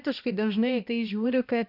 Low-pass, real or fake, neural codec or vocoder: 5.4 kHz; fake; codec, 16 kHz, 1 kbps, X-Codec, HuBERT features, trained on balanced general audio